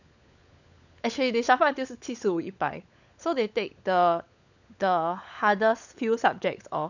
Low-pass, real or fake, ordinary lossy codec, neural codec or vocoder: 7.2 kHz; fake; none; codec, 16 kHz, 16 kbps, FunCodec, trained on LibriTTS, 50 frames a second